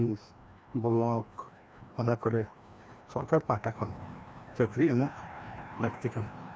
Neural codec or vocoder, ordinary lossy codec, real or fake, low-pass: codec, 16 kHz, 1 kbps, FreqCodec, larger model; none; fake; none